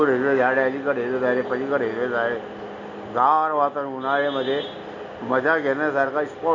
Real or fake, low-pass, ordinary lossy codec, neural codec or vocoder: real; 7.2 kHz; none; none